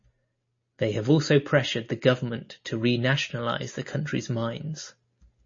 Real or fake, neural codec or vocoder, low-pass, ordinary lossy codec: real; none; 7.2 kHz; MP3, 32 kbps